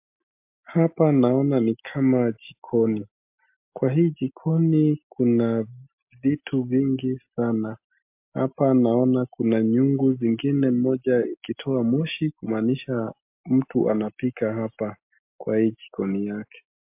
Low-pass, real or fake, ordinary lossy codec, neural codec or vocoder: 3.6 kHz; real; MP3, 32 kbps; none